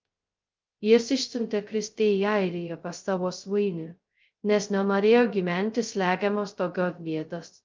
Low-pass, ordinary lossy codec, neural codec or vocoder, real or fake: 7.2 kHz; Opus, 32 kbps; codec, 16 kHz, 0.2 kbps, FocalCodec; fake